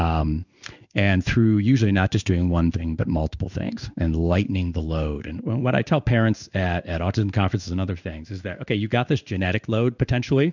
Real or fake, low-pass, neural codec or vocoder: fake; 7.2 kHz; codec, 16 kHz in and 24 kHz out, 1 kbps, XY-Tokenizer